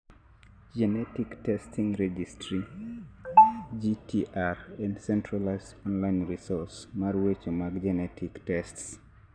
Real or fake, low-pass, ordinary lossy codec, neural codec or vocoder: real; 9.9 kHz; none; none